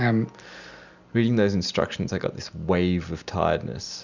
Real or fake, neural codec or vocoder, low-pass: real; none; 7.2 kHz